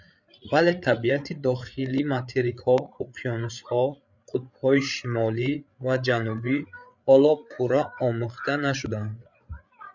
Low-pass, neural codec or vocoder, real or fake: 7.2 kHz; codec, 16 kHz, 8 kbps, FreqCodec, larger model; fake